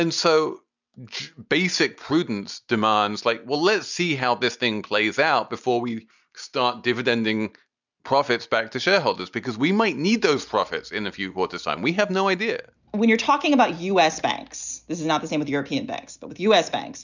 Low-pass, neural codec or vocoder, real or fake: 7.2 kHz; none; real